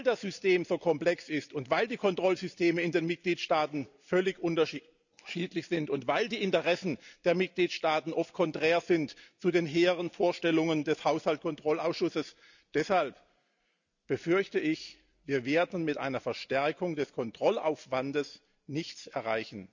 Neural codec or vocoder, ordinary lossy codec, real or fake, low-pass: none; none; real; 7.2 kHz